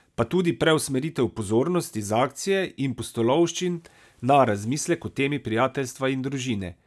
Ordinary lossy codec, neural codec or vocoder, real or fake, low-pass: none; none; real; none